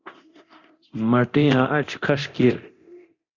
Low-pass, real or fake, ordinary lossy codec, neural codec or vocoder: 7.2 kHz; fake; Opus, 64 kbps; codec, 24 kHz, 0.9 kbps, DualCodec